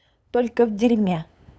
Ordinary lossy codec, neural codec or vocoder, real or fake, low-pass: none; codec, 16 kHz, 8 kbps, FunCodec, trained on LibriTTS, 25 frames a second; fake; none